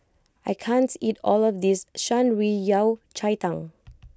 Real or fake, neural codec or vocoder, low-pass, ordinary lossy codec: real; none; none; none